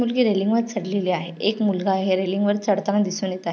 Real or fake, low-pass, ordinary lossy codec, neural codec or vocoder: real; none; none; none